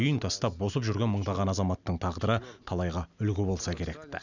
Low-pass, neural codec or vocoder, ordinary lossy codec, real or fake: 7.2 kHz; none; none; real